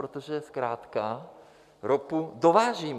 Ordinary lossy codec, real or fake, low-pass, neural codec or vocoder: MP3, 96 kbps; real; 14.4 kHz; none